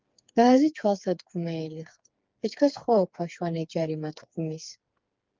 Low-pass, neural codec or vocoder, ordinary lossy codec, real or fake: 7.2 kHz; codec, 16 kHz, 4 kbps, FreqCodec, smaller model; Opus, 32 kbps; fake